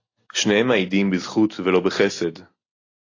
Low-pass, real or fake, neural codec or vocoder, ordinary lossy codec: 7.2 kHz; real; none; AAC, 32 kbps